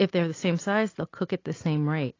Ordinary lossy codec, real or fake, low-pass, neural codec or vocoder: AAC, 32 kbps; real; 7.2 kHz; none